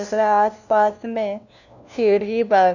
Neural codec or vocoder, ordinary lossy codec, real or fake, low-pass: codec, 16 kHz, 1 kbps, FunCodec, trained on LibriTTS, 50 frames a second; none; fake; 7.2 kHz